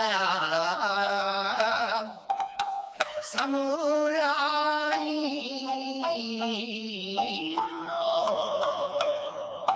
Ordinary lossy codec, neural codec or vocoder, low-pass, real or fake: none; codec, 16 kHz, 2 kbps, FreqCodec, smaller model; none; fake